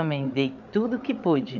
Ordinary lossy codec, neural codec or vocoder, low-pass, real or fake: none; codec, 16 kHz, 8 kbps, FreqCodec, larger model; 7.2 kHz; fake